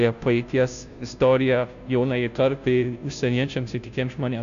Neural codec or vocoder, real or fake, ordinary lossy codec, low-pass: codec, 16 kHz, 0.5 kbps, FunCodec, trained on Chinese and English, 25 frames a second; fake; MP3, 96 kbps; 7.2 kHz